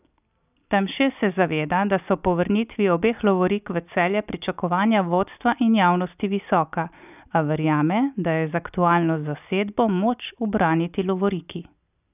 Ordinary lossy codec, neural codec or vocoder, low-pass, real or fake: none; none; 3.6 kHz; real